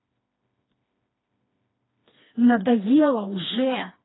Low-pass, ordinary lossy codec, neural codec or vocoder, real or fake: 7.2 kHz; AAC, 16 kbps; codec, 16 kHz, 2 kbps, FreqCodec, smaller model; fake